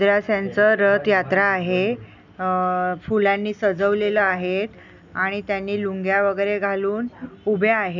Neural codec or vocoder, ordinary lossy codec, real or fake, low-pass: none; none; real; 7.2 kHz